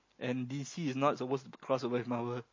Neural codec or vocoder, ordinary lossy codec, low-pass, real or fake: none; MP3, 32 kbps; 7.2 kHz; real